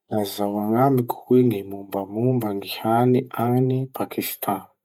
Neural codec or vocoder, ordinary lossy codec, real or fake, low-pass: vocoder, 48 kHz, 128 mel bands, Vocos; none; fake; 19.8 kHz